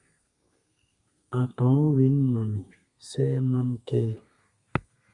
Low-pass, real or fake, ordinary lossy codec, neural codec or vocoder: 10.8 kHz; fake; Opus, 64 kbps; codec, 32 kHz, 1.9 kbps, SNAC